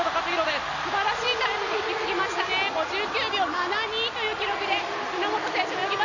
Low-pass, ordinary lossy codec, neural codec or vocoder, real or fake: 7.2 kHz; none; none; real